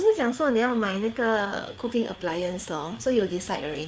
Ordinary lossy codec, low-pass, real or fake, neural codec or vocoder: none; none; fake; codec, 16 kHz, 4 kbps, FreqCodec, larger model